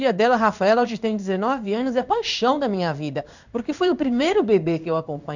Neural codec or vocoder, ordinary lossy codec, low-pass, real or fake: codec, 16 kHz in and 24 kHz out, 1 kbps, XY-Tokenizer; none; 7.2 kHz; fake